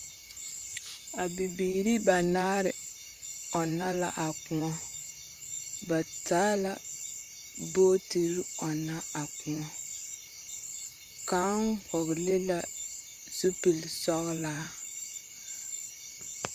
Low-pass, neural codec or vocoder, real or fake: 14.4 kHz; vocoder, 44.1 kHz, 128 mel bands, Pupu-Vocoder; fake